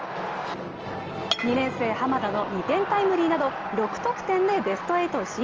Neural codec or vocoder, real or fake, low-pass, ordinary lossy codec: none; real; 7.2 kHz; Opus, 24 kbps